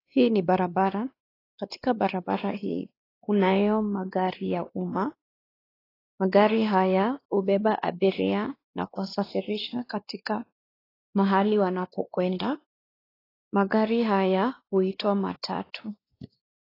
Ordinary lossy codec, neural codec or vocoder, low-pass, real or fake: AAC, 24 kbps; codec, 16 kHz, 2 kbps, X-Codec, WavLM features, trained on Multilingual LibriSpeech; 5.4 kHz; fake